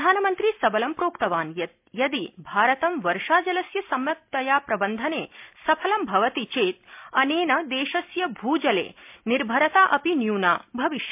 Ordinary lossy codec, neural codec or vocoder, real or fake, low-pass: MP3, 32 kbps; none; real; 3.6 kHz